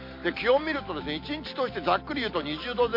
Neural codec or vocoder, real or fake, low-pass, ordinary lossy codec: none; real; 5.4 kHz; MP3, 32 kbps